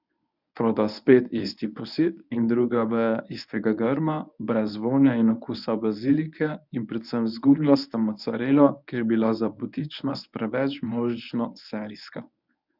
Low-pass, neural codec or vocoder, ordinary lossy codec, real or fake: 5.4 kHz; codec, 24 kHz, 0.9 kbps, WavTokenizer, medium speech release version 1; none; fake